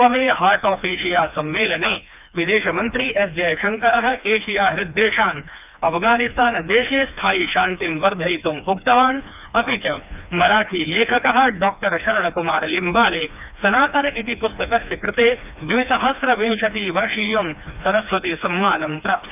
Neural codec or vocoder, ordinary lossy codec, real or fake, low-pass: codec, 16 kHz, 2 kbps, FreqCodec, smaller model; none; fake; 3.6 kHz